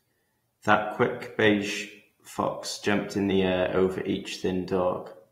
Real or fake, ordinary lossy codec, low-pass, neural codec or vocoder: real; AAC, 48 kbps; 19.8 kHz; none